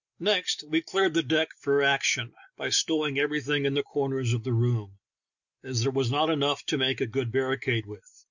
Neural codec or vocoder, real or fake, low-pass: none; real; 7.2 kHz